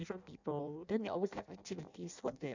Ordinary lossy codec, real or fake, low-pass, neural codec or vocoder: none; fake; 7.2 kHz; codec, 16 kHz in and 24 kHz out, 0.6 kbps, FireRedTTS-2 codec